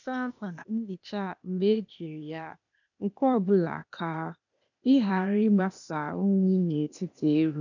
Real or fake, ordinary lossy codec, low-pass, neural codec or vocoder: fake; none; 7.2 kHz; codec, 16 kHz, 0.8 kbps, ZipCodec